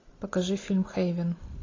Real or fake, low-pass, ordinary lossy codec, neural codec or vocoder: real; 7.2 kHz; AAC, 32 kbps; none